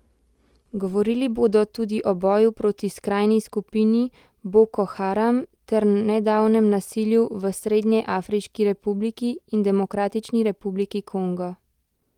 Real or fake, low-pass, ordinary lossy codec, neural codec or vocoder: real; 19.8 kHz; Opus, 24 kbps; none